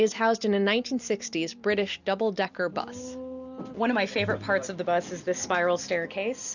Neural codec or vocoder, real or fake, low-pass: none; real; 7.2 kHz